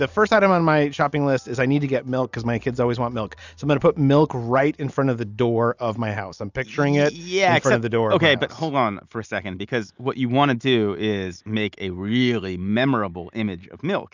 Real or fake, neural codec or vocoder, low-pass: real; none; 7.2 kHz